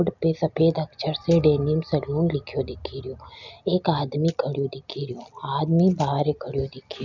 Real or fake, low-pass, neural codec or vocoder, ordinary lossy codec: real; 7.2 kHz; none; none